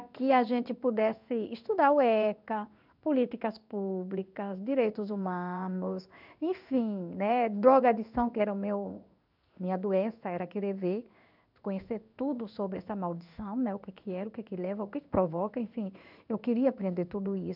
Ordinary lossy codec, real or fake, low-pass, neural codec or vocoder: none; fake; 5.4 kHz; codec, 16 kHz in and 24 kHz out, 1 kbps, XY-Tokenizer